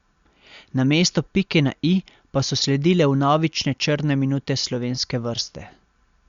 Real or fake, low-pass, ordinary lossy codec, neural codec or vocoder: real; 7.2 kHz; Opus, 64 kbps; none